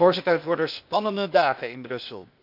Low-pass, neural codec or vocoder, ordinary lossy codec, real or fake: 5.4 kHz; codec, 16 kHz, 0.8 kbps, ZipCodec; none; fake